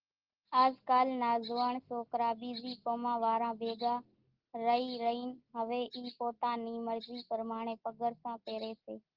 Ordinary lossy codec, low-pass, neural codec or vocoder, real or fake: Opus, 16 kbps; 5.4 kHz; none; real